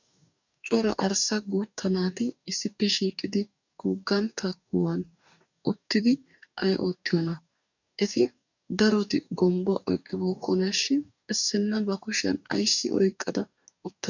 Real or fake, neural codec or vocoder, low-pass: fake; codec, 44.1 kHz, 2.6 kbps, DAC; 7.2 kHz